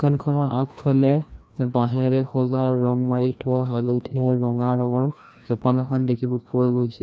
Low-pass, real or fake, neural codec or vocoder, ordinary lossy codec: none; fake; codec, 16 kHz, 1 kbps, FreqCodec, larger model; none